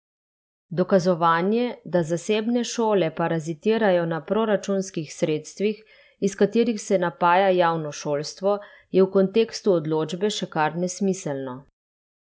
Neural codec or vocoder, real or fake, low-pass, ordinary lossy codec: none; real; none; none